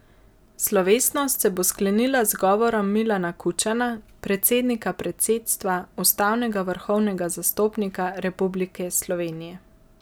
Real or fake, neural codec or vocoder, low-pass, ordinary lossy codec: real; none; none; none